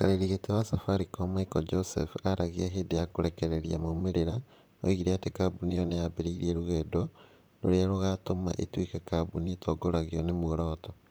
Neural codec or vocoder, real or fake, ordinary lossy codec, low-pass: vocoder, 44.1 kHz, 128 mel bands, Pupu-Vocoder; fake; none; none